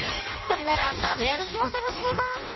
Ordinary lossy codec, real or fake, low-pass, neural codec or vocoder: MP3, 24 kbps; fake; 7.2 kHz; codec, 16 kHz in and 24 kHz out, 0.6 kbps, FireRedTTS-2 codec